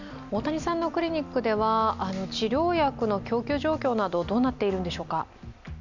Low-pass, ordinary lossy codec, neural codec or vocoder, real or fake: 7.2 kHz; none; none; real